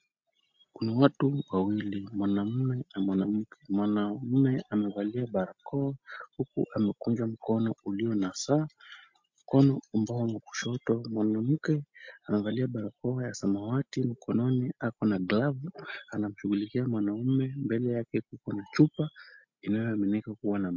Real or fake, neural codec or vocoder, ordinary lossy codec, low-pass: real; none; MP3, 48 kbps; 7.2 kHz